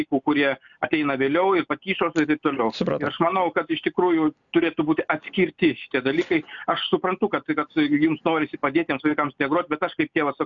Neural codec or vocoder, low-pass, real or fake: none; 7.2 kHz; real